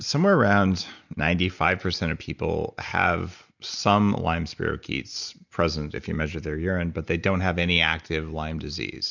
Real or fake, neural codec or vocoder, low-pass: real; none; 7.2 kHz